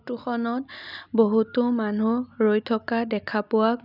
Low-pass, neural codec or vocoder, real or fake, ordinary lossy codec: 5.4 kHz; none; real; none